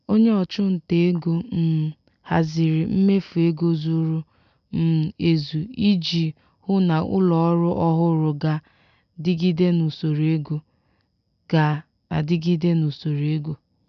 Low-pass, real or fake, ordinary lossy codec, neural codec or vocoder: 7.2 kHz; real; none; none